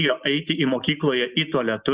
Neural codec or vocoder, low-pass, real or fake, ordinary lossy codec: none; 3.6 kHz; real; Opus, 64 kbps